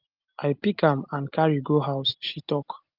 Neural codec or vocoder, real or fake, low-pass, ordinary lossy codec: none; real; 5.4 kHz; Opus, 24 kbps